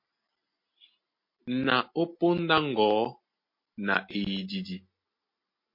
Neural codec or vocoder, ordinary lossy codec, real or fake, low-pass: none; MP3, 32 kbps; real; 5.4 kHz